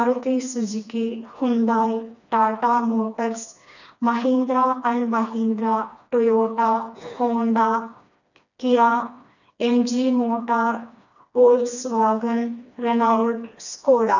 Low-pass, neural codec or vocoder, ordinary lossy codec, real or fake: 7.2 kHz; codec, 16 kHz, 2 kbps, FreqCodec, smaller model; none; fake